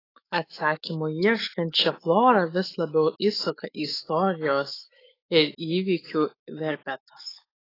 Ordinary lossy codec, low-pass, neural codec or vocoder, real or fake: AAC, 24 kbps; 5.4 kHz; autoencoder, 48 kHz, 128 numbers a frame, DAC-VAE, trained on Japanese speech; fake